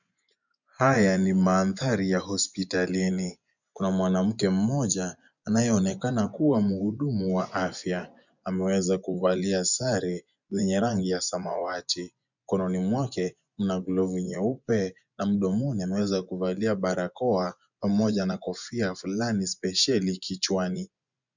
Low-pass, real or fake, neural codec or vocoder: 7.2 kHz; fake; vocoder, 24 kHz, 100 mel bands, Vocos